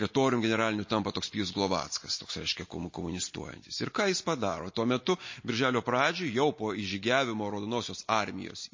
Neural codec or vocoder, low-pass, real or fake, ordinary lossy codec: none; 7.2 kHz; real; MP3, 32 kbps